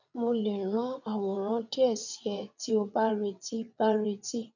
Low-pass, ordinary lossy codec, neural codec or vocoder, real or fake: 7.2 kHz; none; vocoder, 22.05 kHz, 80 mel bands, WaveNeXt; fake